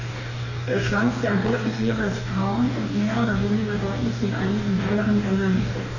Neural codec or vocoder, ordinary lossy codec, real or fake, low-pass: codec, 44.1 kHz, 2.6 kbps, DAC; none; fake; 7.2 kHz